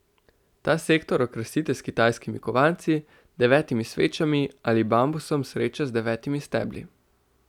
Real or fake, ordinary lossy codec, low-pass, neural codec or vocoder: real; none; 19.8 kHz; none